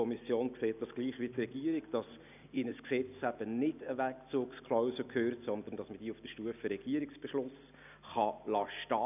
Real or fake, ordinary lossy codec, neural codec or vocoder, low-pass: real; none; none; 3.6 kHz